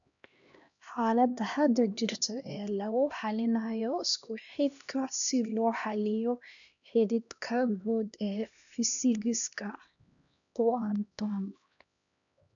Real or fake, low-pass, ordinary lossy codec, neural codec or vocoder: fake; 7.2 kHz; none; codec, 16 kHz, 1 kbps, X-Codec, HuBERT features, trained on LibriSpeech